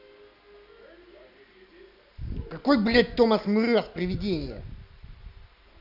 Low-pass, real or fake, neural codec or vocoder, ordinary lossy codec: 5.4 kHz; real; none; none